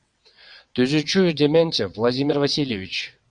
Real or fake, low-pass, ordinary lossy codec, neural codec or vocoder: fake; 9.9 kHz; Opus, 64 kbps; vocoder, 22.05 kHz, 80 mel bands, WaveNeXt